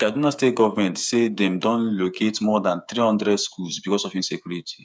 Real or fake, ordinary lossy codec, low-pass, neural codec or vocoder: fake; none; none; codec, 16 kHz, 8 kbps, FreqCodec, smaller model